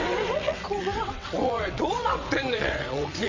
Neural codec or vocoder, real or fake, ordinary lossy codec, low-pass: vocoder, 22.05 kHz, 80 mel bands, WaveNeXt; fake; MP3, 48 kbps; 7.2 kHz